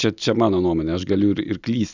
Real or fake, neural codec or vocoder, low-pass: real; none; 7.2 kHz